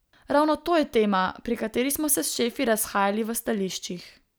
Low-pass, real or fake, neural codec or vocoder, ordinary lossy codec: none; real; none; none